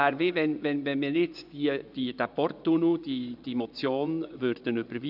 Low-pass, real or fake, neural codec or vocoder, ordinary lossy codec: 5.4 kHz; fake; vocoder, 24 kHz, 100 mel bands, Vocos; none